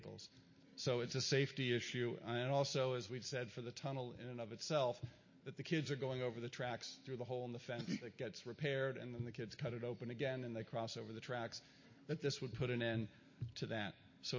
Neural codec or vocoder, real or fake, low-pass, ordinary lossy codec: none; real; 7.2 kHz; MP3, 32 kbps